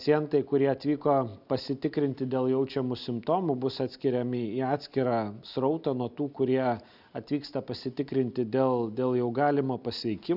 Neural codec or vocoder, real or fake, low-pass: none; real; 5.4 kHz